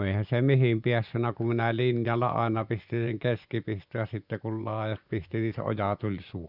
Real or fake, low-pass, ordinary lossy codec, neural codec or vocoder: fake; 5.4 kHz; none; vocoder, 24 kHz, 100 mel bands, Vocos